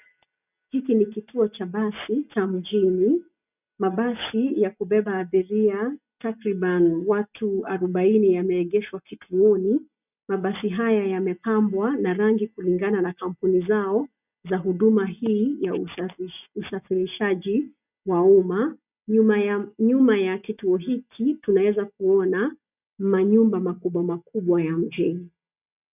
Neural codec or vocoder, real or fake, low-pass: none; real; 3.6 kHz